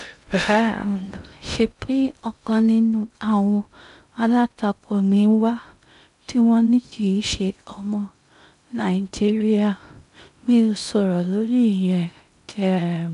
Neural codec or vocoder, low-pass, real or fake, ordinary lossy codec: codec, 16 kHz in and 24 kHz out, 0.6 kbps, FocalCodec, streaming, 2048 codes; 10.8 kHz; fake; none